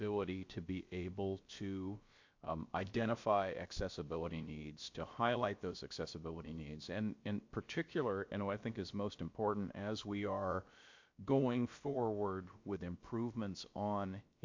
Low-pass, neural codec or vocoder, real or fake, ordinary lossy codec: 7.2 kHz; codec, 16 kHz, about 1 kbps, DyCAST, with the encoder's durations; fake; MP3, 48 kbps